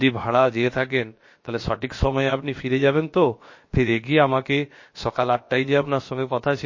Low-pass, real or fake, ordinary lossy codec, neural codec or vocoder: 7.2 kHz; fake; MP3, 32 kbps; codec, 16 kHz, about 1 kbps, DyCAST, with the encoder's durations